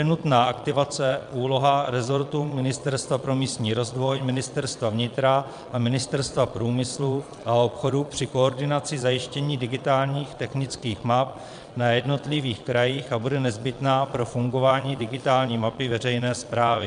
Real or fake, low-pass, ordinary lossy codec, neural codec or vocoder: fake; 9.9 kHz; AAC, 96 kbps; vocoder, 22.05 kHz, 80 mel bands, Vocos